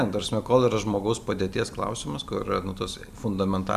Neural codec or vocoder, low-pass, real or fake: vocoder, 44.1 kHz, 128 mel bands every 256 samples, BigVGAN v2; 14.4 kHz; fake